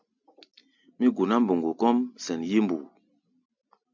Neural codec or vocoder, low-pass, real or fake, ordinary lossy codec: none; 7.2 kHz; real; MP3, 64 kbps